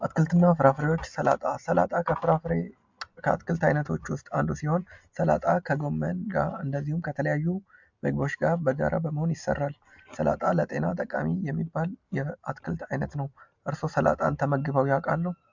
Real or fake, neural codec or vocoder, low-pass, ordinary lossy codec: real; none; 7.2 kHz; MP3, 64 kbps